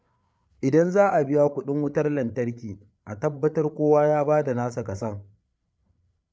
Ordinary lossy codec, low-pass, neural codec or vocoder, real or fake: none; none; codec, 16 kHz, 8 kbps, FreqCodec, larger model; fake